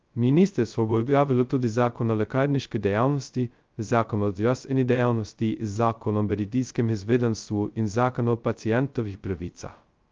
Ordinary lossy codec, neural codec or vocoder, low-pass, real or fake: Opus, 24 kbps; codec, 16 kHz, 0.2 kbps, FocalCodec; 7.2 kHz; fake